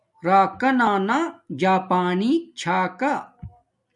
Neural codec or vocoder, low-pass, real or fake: none; 10.8 kHz; real